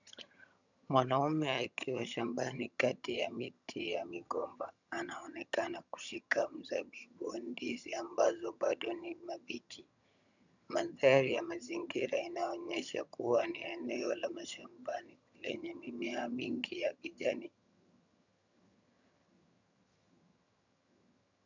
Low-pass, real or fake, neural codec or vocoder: 7.2 kHz; fake; vocoder, 22.05 kHz, 80 mel bands, HiFi-GAN